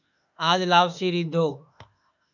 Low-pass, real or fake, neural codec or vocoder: 7.2 kHz; fake; autoencoder, 48 kHz, 32 numbers a frame, DAC-VAE, trained on Japanese speech